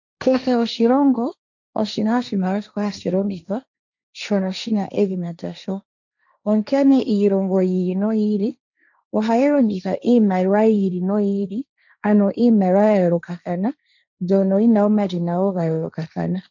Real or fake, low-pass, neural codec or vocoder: fake; 7.2 kHz; codec, 16 kHz, 1.1 kbps, Voila-Tokenizer